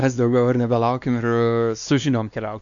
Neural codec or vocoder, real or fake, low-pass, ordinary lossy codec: codec, 16 kHz, 2 kbps, X-Codec, WavLM features, trained on Multilingual LibriSpeech; fake; 7.2 kHz; MP3, 96 kbps